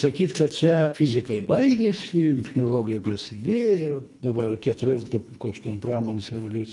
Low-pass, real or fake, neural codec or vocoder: 10.8 kHz; fake; codec, 24 kHz, 1.5 kbps, HILCodec